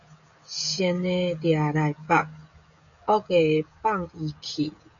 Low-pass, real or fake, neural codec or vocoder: 7.2 kHz; fake; codec, 16 kHz, 16 kbps, FreqCodec, smaller model